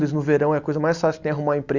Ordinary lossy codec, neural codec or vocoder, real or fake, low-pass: Opus, 64 kbps; none; real; 7.2 kHz